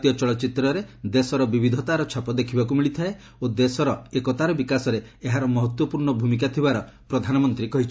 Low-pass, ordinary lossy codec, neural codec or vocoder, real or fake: none; none; none; real